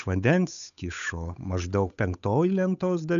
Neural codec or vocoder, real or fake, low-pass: codec, 16 kHz, 8 kbps, FunCodec, trained on Chinese and English, 25 frames a second; fake; 7.2 kHz